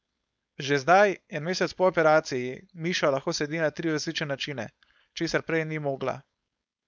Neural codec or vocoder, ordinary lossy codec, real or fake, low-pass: codec, 16 kHz, 4.8 kbps, FACodec; none; fake; none